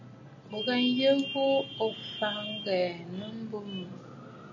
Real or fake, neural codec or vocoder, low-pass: real; none; 7.2 kHz